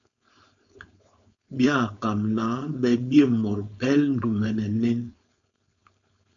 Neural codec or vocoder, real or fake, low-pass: codec, 16 kHz, 4.8 kbps, FACodec; fake; 7.2 kHz